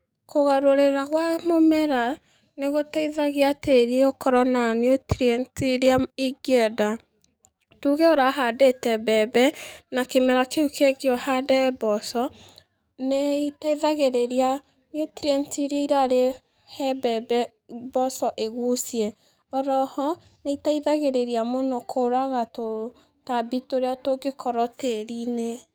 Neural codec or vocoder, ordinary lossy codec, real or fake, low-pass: codec, 44.1 kHz, 7.8 kbps, DAC; none; fake; none